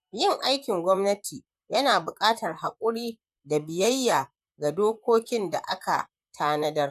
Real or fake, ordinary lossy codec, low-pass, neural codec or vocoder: fake; none; 14.4 kHz; vocoder, 44.1 kHz, 128 mel bands, Pupu-Vocoder